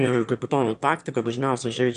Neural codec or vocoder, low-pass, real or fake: autoencoder, 22.05 kHz, a latent of 192 numbers a frame, VITS, trained on one speaker; 9.9 kHz; fake